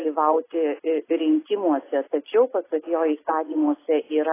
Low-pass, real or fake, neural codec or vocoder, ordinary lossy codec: 3.6 kHz; real; none; AAC, 16 kbps